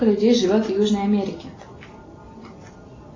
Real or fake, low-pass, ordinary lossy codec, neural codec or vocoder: real; 7.2 kHz; AAC, 32 kbps; none